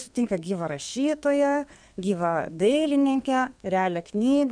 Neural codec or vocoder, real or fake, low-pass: codec, 32 kHz, 1.9 kbps, SNAC; fake; 9.9 kHz